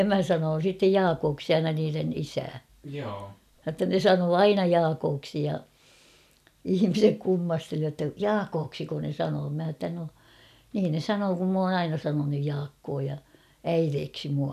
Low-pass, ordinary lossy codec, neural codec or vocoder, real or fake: 14.4 kHz; none; none; real